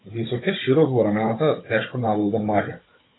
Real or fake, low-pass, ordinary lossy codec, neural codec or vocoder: fake; 7.2 kHz; AAC, 16 kbps; codec, 16 kHz, 16 kbps, FunCodec, trained on Chinese and English, 50 frames a second